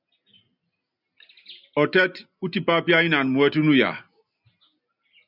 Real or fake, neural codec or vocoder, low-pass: real; none; 5.4 kHz